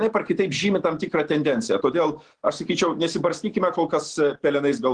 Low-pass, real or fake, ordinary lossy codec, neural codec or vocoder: 9.9 kHz; real; Opus, 16 kbps; none